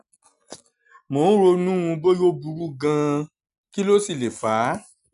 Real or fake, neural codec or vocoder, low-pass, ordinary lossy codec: real; none; 10.8 kHz; none